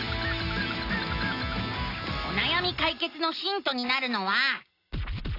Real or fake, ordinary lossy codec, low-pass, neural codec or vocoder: real; AAC, 32 kbps; 5.4 kHz; none